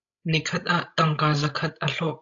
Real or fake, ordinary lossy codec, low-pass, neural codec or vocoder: fake; AAC, 48 kbps; 7.2 kHz; codec, 16 kHz, 16 kbps, FreqCodec, larger model